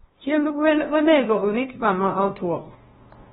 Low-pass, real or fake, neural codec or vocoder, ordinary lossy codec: 7.2 kHz; fake; codec, 16 kHz, 0.5 kbps, FunCodec, trained on LibriTTS, 25 frames a second; AAC, 16 kbps